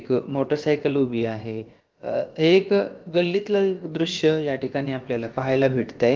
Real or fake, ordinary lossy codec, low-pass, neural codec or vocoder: fake; Opus, 32 kbps; 7.2 kHz; codec, 16 kHz, about 1 kbps, DyCAST, with the encoder's durations